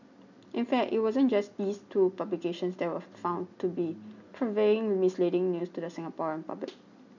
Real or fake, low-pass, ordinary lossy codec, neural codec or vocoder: real; 7.2 kHz; none; none